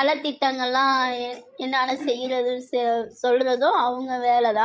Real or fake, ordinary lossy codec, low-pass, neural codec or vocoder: fake; none; none; codec, 16 kHz, 16 kbps, FreqCodec, larger model